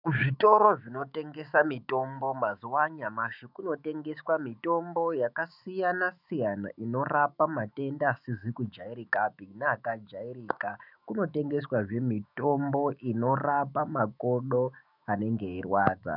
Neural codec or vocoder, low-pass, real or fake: autoencoder, 48 kHz, 128 numbers a frame, DAC-VAE, trained on Japanese speech; 5.4 kHz; fake